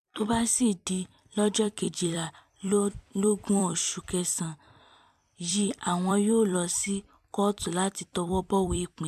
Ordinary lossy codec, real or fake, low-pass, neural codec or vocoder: MP3, 96 kbps; fake; 14.4 kHz; vocoder, 44.1 kHz, 128 mel bands every 256 samples, BigVGAN v2